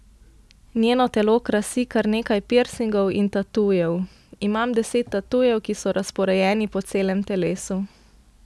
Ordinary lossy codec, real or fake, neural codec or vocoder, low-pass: none; real; none; none